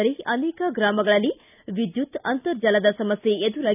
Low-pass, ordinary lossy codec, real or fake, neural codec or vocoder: 3.6 kHz; none; real; none